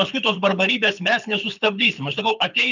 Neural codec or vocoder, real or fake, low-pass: codec, 24 kHz, 6 kbps, HILCodec; fake; 7.2 kHz